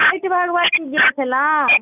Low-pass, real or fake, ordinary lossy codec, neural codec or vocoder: 3.6 kHz; real; none; none